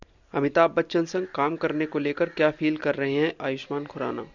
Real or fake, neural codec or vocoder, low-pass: real; none; 7.2 kHz